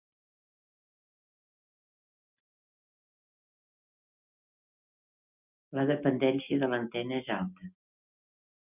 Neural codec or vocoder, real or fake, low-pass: none; real; 3.6 kHz